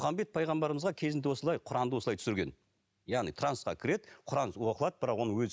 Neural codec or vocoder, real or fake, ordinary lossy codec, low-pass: none; real; none; none